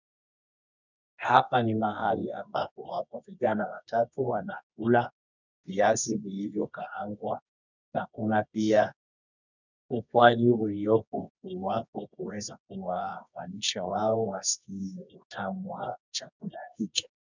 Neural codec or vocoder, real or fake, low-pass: codec, 24 kHz, 0.9 kbps, WavTokenizer, medium music audio release; fake; 7.2 kHz